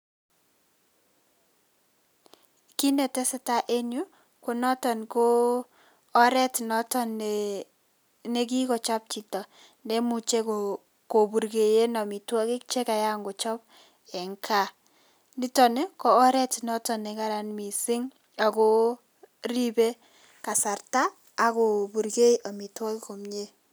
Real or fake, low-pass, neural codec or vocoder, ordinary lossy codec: real; none; none; none